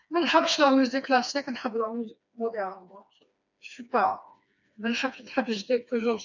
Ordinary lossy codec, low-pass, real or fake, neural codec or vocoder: none; 7.2 kHz; fake; codec, 16 kHz, 2 kbps, FreqCodec, smaller model